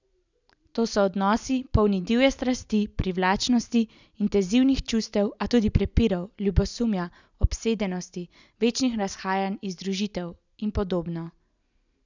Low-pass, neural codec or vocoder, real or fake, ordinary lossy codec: 7.2 kHz; none; real; none